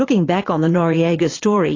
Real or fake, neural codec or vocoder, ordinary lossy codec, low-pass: fake; vocoder, 22.05 kHz, 80 mel bands, Vocos; AAC, 32 kbps; 7.2 kHz